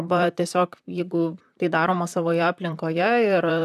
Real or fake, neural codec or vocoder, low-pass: fake; vocoder, 44.1 kHz, 128 mel bands, Pupu-Vocoder; 14.4 kHz